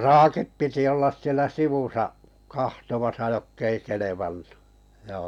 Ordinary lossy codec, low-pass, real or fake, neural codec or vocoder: none; 19.8 kHz; real; none